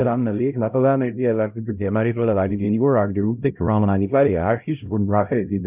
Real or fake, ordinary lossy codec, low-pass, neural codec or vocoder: fake; none; 3.6 kHz; codec, 16 kHz, 0.5 kbps, X-Codec, HuBERT features, trained on LibriSpeech